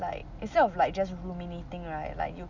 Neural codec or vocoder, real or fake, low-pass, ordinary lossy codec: none; real; 7.2 kHz; none